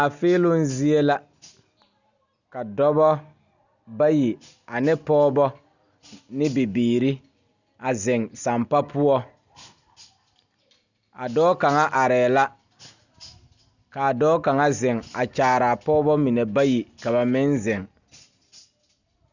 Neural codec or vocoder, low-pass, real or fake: none; 7.2 kHz; real